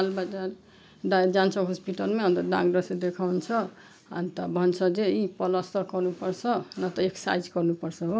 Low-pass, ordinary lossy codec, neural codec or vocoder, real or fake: none; none; none; real